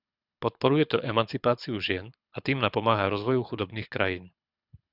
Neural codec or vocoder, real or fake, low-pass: codec, 24 kHz, 6 kbps, HILCodec; fake; 5.4 kHz